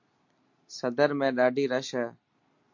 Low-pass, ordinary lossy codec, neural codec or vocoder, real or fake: 7.2 kHz; MP3, 48 kbps; none; real